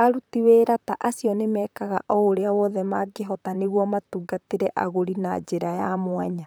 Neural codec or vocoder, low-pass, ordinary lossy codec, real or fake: vocoder, 44.1 kHz, 128 mel bands, Pupu-Vocoder; none; none; fake